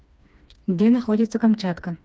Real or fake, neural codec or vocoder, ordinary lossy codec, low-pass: fake; codec, 16 kHz, 2 kbps, FreqCodec, smaller model; none; none